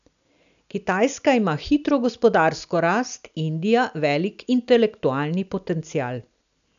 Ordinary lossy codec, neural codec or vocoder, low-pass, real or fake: none; none; 7.2 kHz; real